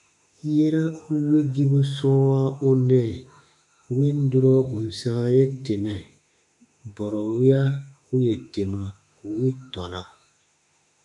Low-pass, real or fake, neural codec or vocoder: 10.8 kHz; fake; autoencoder, 48 kHz, 32 numbers a frame, DAC-VAE, trained on Japanese speech